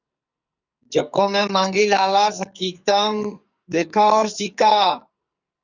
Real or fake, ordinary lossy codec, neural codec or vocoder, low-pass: fake; Opus, 64 kbps; codec, 44.1 kHz, 2.6 kbps, SNAC; 7.2 kHz